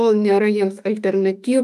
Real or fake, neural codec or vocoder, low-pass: fake; autoencoder, 48 kHz, 32 numbers a frame, DAC-VAE, trained on Japanese speech; 14.4 kHz